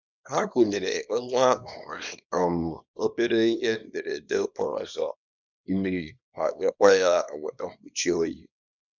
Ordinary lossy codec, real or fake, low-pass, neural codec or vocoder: none; fake; 7.2 kHz; codec, 24 kHz, 0.9 kbps, WavTokenizer, small release